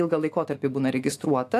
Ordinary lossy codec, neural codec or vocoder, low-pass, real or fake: AAC, 64 kbps; autoencoder, 48 kHz, 128 numbers a frame, DAC-VAE, trained on Japanese speech; 14.4 kHz; fake